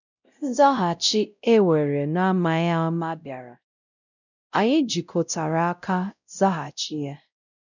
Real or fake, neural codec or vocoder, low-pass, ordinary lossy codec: fake; codec, 16 kHz, 0.5 kbps, X-Codec, WavLM features, trained on Multilingual LibriSpeech; 7.2 kHz; none